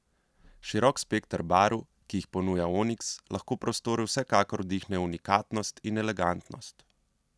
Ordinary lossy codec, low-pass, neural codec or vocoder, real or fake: none; none; none; real